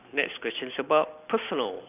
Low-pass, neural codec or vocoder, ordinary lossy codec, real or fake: 3.6 kHz; none; none; real